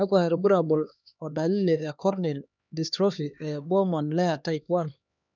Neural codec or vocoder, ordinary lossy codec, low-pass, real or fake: codec, 16 kHz, 2 kbps, X-Codec, HuBERT features, trained on LibriSpeech; none; 7.2 kHz; fake